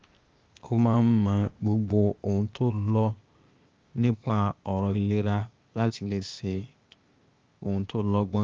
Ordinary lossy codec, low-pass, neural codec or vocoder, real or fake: Opus, 32 kbps; 7.2 kHz; codec, 16 kHz, 0.8 kbps, ZipCodec; fake